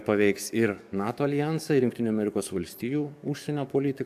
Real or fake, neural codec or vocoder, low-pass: fake; codec, 44.1 kHz, 7.8 kbps, DAC; 14.4 kHz